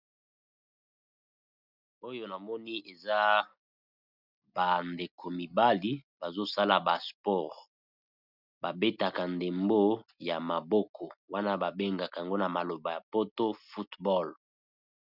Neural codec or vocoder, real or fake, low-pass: none; real; 5.4 kHz